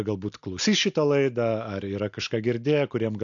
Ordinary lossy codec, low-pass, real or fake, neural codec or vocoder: AAC, 64 kbps; 7.2 kHz; real; none